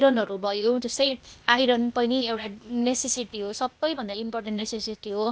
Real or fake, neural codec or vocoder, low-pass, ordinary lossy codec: fake; codec, 16 kHz, 0.8 kbps, ZipCodec; none; none